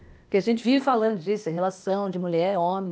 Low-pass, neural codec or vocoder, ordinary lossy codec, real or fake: none; codec, 16 kHz, 0.8 kbps, ZipCodec; none; fake